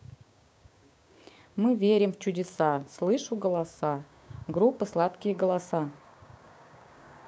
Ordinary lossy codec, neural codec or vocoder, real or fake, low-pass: none; codec, 16 kHz, 6 kbps, DAC; fake; none